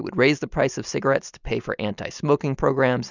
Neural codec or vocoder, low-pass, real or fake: none; 7.2 kHz; real